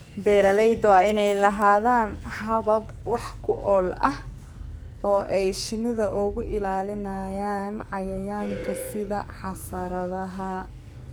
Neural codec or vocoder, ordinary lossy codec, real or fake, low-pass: codec, 44.1 kHz, 2.6 kbps, SNAC; none; fake; none